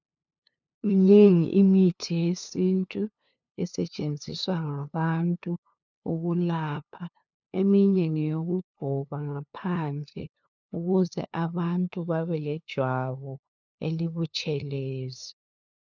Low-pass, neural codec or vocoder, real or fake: 7.2 kHz; codec, 16 kHz, 2 kbps, FunCodec, trained on LibriTTS, 25 frames a second; fake